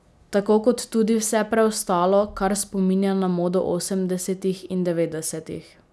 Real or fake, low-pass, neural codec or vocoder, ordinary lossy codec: real; none; none; none